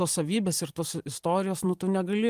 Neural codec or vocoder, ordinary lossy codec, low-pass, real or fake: vocoder, 44.1 kHz, 128 mel bands every 512 samples, BigVGAN v2; Opus, 24 kbps; 14.4 kHz; fake